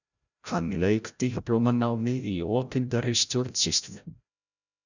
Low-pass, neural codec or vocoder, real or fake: 7.2 kHz; codec, 16 kHz, 0.5 kbps, FreqCodec, larger model; fake